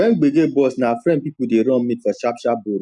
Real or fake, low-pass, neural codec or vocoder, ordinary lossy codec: real; 10.8 kHz; none; none